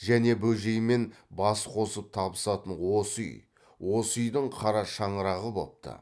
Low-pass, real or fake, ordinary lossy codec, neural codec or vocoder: none; real; none; none